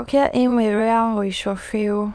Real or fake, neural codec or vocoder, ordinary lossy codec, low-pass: fake; autoencoder, 22.05 kHz, a latent of 192 numbers a frame, VITS, trained on many speakers; none; none